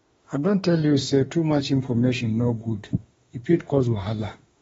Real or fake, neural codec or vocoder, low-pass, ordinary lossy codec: fake; autoencoder, 48 kHz, 32 numbers a frame, DAC-VAE, trained on Japanese speech; 19.8 kHz; AAC, 24 kbps